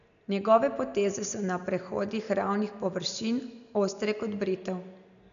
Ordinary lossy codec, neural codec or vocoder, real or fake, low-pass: none; none; real; 7.2 kHz